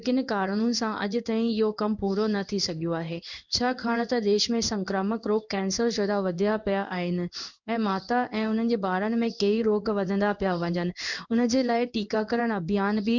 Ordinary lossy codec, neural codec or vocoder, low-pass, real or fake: none; codec, 16 kHz in and 24 kHz out, 1 kbps, XY-Tokenizer; 7.2 kHz; fake